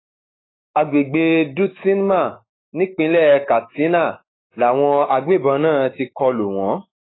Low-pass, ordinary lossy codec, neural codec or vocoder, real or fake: 7.2 kHz; AAC, 16 kbps; autoencoder, 48 kHz, 128 numbers a frame, DAC-VAE, trained on Japanese speech; fake